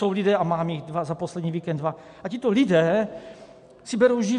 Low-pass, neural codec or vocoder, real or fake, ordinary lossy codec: 10.8 kHz; none; real; MP3, 64 kbps